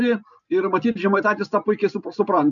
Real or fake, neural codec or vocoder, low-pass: real; none; 7.2 kHz